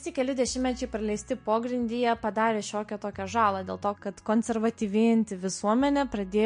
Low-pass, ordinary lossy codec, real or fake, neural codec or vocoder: 9.9 kHz; MP3, 48 kbps; real; none